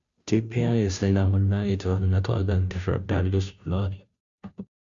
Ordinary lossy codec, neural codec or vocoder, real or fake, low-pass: Opus, 64 kbps; codec, 16 kHz, 0.5 kbps, FunCodec, trained on Chinese and English, 25 frames a second; fake; 7.2 kHz